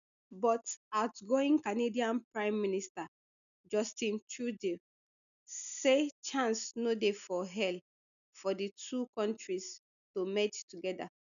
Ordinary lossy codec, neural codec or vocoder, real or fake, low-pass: none; none; real; 7.2 kHz